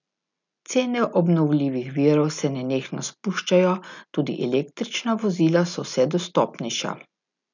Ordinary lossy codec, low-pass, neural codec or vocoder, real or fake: none; 7.2 kHz; none; real